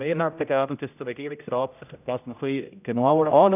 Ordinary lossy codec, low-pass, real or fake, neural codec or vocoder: none; 3.6 kHz; fake; codec, 16 kHz, 0.5 kbps, X-Codec, HuBERT features, trained on general audio